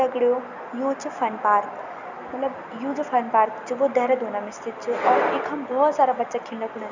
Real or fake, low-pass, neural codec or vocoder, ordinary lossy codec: real; 7.2 kHz; none; none